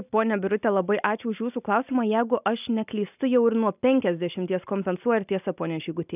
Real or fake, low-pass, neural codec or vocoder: real; 3.6 kHz; none